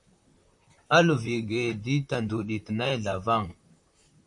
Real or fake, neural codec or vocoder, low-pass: fake; vocoder, 44.1 kHz, 128 mel bands, Pupu-Vocoder; 10.8 kHz